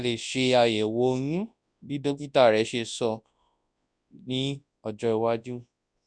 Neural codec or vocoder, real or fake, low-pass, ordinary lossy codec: codec, 24 kHz, 0.9 kbps, WavTokenizer, large speech release; fake; 9.9 kHz; none